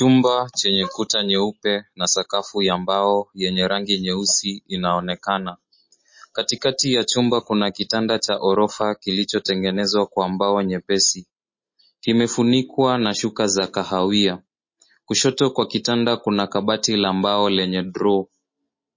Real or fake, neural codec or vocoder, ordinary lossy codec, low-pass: real; none; MP3, 32 kbps; 7.2 kHz